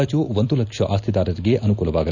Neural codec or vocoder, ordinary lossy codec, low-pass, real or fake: none; none; 7.2 kHz; real